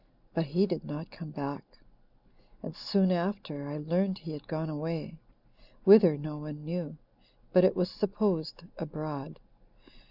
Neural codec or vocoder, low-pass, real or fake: none; 5.4 kHz; real